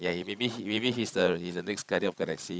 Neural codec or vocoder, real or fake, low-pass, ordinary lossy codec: codec, 16 kHz, 16 kbps, FreqCodec, larger model; fake; none; none